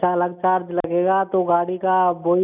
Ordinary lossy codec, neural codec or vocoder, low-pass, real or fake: none; none; 3.6 kHz; real